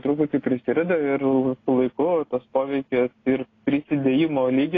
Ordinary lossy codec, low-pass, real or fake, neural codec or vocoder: AAC, 32 kbps; 7.2 kHz; real; none